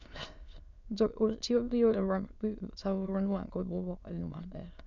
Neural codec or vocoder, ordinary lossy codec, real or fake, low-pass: autoencoder, 22.05 kHz, a latent of 192 numbers a frame, VITS, trained on many speakers; none; fake; 7.2 kHz